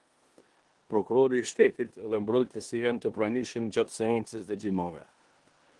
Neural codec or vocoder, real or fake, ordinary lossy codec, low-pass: codec, 16 kHz in and 24 kHz out, 0.9 kbps, LongCat-Audio-Codec, four codebook decoder; fake; Opus, 24 kbps; 10.8 kHz